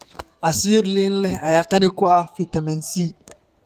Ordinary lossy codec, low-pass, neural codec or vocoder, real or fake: Opus, 32 kbps; 14.4 kHz; codec, 32 kHz, 1.9 kbps, SNAC; fake